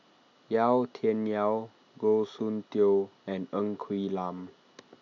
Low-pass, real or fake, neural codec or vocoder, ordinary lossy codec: 7.2 kHz; real; none; none